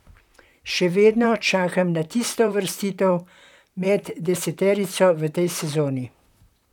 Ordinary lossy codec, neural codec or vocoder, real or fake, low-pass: none; vocoder, 44.1 kHz, 128 mel bands, Pupu-Vocoder; fake; 19.8 kHz